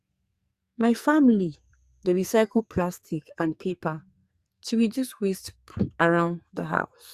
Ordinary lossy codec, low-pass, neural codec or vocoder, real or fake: Opus, 64 kbps; 14.4 kHz; codec, 44.1 kHz, 2.6 kbps, SNAC; fake